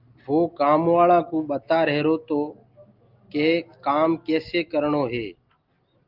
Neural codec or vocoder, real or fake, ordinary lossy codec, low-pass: none; real; Opus, 32 kbps; 5.4 kHz